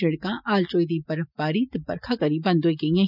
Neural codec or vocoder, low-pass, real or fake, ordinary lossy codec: none; 5.4 kHz; real; none